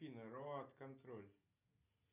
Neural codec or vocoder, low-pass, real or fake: none; 3.6 kHz; real